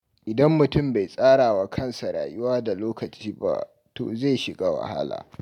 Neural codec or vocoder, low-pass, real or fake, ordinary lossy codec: none; 19.8 kHz; real; none